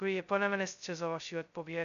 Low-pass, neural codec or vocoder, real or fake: 7.2 kHz; codec, 16 kHz, 0.2 kbps, FocalCodec; fake